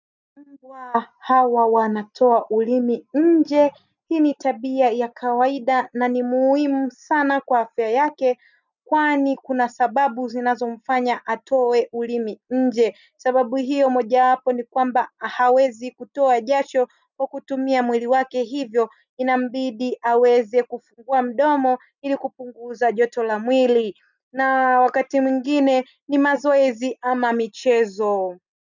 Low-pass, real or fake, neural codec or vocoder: 7.2 kHz; real; none